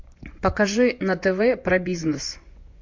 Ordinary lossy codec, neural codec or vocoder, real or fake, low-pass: MP3, 48 kbps; vocoder, 24 kHz, 100 mel bands, Vocos; fake; 7.2 kHz